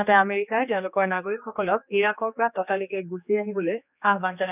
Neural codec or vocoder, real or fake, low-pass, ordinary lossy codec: codec, 16 kHz, 2 kbps, X-Codec, HuBERT features, trained on general audio; fake; 3.6 kHz; none